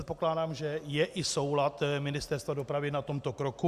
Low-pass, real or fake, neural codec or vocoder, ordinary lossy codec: 14.4 kHz; real; none; Opus, 64 kbps